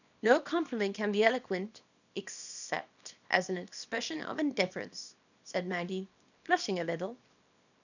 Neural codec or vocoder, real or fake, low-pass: codec, 24 kHz, 0.9 kbps, WavTokenizer, small release; fake; 7.2 kHz